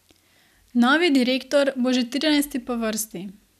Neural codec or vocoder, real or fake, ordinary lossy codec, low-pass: none; real; none; 14.4 kHz